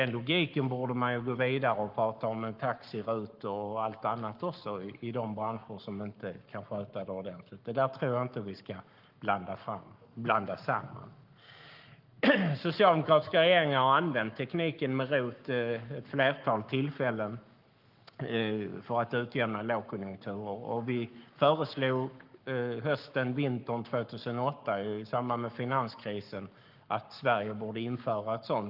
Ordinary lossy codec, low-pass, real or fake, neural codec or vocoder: Opus, 24 kbps; 5.4 kHz; fake; codec, 44.1 kHz, 7.8 kbps, Pupu-Codec